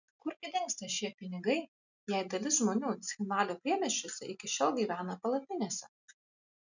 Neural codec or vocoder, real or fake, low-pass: none; real; 7.2 kHz